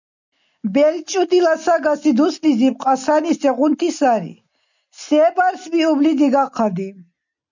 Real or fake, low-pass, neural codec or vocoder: real; 7.2 kHz; none